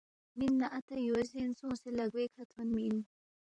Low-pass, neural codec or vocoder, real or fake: 9.9 kHz; vocoder, 44.1 kHz, 128 mel bands, Pupu-Vocoder; fake